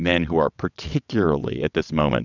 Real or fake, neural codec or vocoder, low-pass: fake; vocoder, 22.05 kHz, 80 mel bands, WaveNeXt; 7.2 kHz